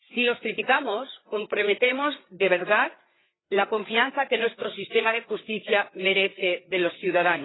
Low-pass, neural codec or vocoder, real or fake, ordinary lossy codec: 7.2 kHz; codec, 16 kHz, 4 kbps, FreqCodec, larger model; fake; AAC, 16 kbps